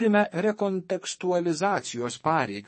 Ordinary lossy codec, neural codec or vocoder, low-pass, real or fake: MP3, 32 kbps; codec, 44.1 kHz, 2.6 kbps, SNAC; 10.8 kHz; fake